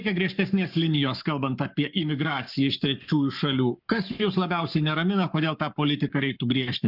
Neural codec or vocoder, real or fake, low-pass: codec, 44.1 kHz, 7.8 kbps, Pupu-Codec; fake; 5.4 kHz